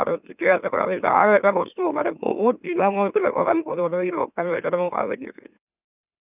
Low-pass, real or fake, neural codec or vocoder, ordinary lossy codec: 3.6 kHz; fake; autoencoder, 44.1 kHz, a latent of 192 numbers a frame, MeloTTS; none